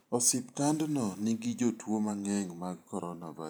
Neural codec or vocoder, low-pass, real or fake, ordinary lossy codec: vocoder, 44.1 kHz, 128 mel bands every 512 samples, BigVGAN v2; none; fake; none